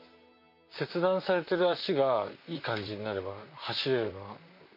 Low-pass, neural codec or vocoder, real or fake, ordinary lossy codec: 5.4 kHz; vocoder, 44.1 kHz, 128 mel bands, Pupu-Vocoder; fake; MP3, 32 kbps